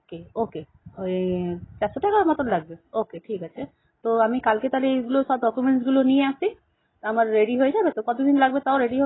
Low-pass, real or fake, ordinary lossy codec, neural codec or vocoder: 7.2 kHz; real; AAC, 16 kbps; none